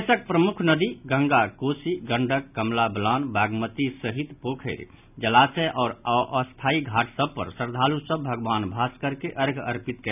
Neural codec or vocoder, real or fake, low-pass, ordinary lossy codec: none; real; 3.6 kHz; none